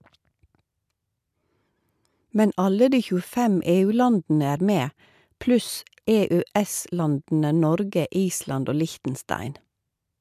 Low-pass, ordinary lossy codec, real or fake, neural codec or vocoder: 14.4 kHz; MP3, 96 kbps; real; none